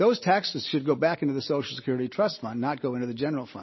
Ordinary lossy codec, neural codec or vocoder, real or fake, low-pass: MP3, 24 kbps; none; real; 7.2 kHz